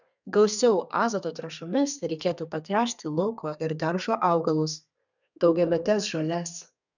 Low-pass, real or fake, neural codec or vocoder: 7.2 kHz; fake; codec, 32 kHz, 1.9 kbps, SNAC